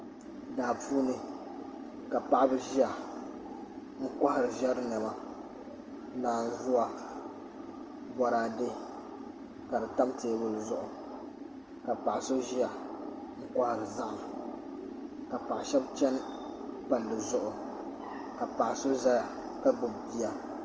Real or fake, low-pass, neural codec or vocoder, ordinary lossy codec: real; 7.2 kHz; none; Opus, 24 kbps